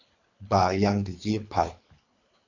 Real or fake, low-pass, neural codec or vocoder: fake; 7.2 kHz; codec, 24 kHz, 3 kbps, HILCodec